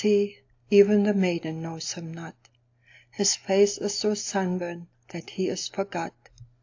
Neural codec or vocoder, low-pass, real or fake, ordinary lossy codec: none; 7.2 kHz; real; AAC, 48 kbps